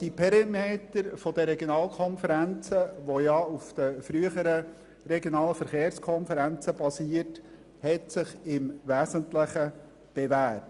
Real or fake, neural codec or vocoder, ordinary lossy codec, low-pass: real; none; Opus, 64 kbps; 10.8 kHz